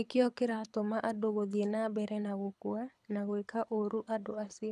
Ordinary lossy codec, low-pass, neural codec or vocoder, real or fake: none; none; codec, 24 kHz, 6 kbps, HILCodec; fake